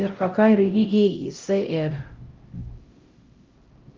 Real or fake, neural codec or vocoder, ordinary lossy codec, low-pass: fake; codec, 16 kHz, 0.5 kbps, X-Codec, HuBERT features, trained on LibriSpeech; Opus, 32 kbps; 7.2 kHz